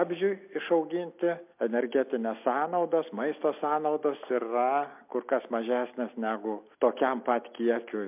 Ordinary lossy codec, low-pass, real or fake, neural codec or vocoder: MP3, 32 kbps; 3.6 kHz; real; none